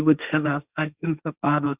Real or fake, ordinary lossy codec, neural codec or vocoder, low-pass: fake; Opus, 64 kbps; codec, 16 kHz, 1.1 kbps, Voila-Tokenizer; 3.6 kHz